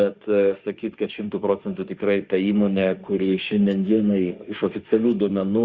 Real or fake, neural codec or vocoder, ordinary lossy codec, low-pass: fake; autoencoder, 48 kHz, 32 numbers a frame, DAC-VAE, trained on Japanese speech; Opus, 64 kbps; 7.2 kHz